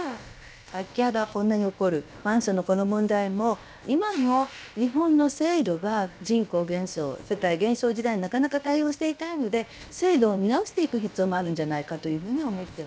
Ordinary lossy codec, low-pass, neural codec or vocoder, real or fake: none; none; codec, 16 kHz, about 1 kbps, DyCAST, with the encoder's durations; fake